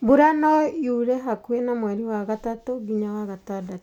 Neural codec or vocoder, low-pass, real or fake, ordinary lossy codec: none; 19.8 kHz; real; none